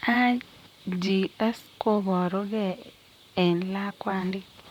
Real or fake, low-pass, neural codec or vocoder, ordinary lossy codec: fake; 19.8 kHz; vocoder, 44.1 kHz, 128 mel bands, Pupu-Vocoder; none